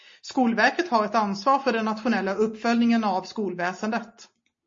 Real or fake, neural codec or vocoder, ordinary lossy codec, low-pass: real; none; MP3, 32 kbps; 7.2 kHz